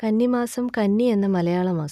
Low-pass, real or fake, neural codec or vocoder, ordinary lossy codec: 14.4 kHz; real; none; none